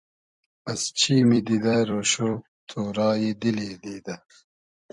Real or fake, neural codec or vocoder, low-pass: fake; vocoder, 44.1 kHz, 128 mel bands every 256 samples, BigVGAN v2; 10.8 kHz